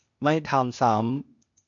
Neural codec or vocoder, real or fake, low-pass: codec, 16 kHz, 0.8 kbps, ZipCodec; fake; 7.2 kHz